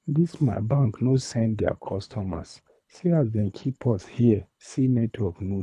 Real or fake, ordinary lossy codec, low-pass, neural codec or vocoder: fake; none; none; codec, 24 kHz, 3 kbps, HILCodec